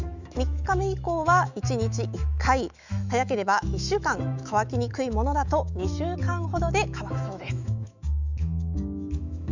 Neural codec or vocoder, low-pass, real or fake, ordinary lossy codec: autoencoder, 48 kHz, 128 numbers a frame, DAC-VAE, trained on Japanese speech; 7.2 kHz; fake; none